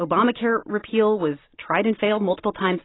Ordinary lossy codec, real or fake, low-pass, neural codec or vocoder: AAC, 16 kbps; real; 7.2 kHz; none